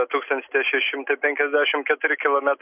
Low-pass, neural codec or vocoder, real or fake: 3.6 kHz; none; real